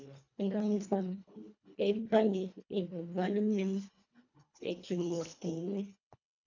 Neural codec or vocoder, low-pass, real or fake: codec, 24 kHz, 1.5 kbps, HILCodec; 7.2 kHz; fake